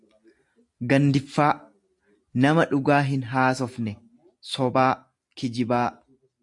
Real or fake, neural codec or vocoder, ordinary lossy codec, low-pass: real; none; AAC, 64 kbps; 10.8 kHz